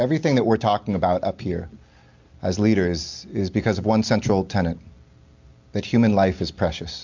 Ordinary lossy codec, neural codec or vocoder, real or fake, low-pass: MP3, 64 kbps; none; real; 7.2 kHz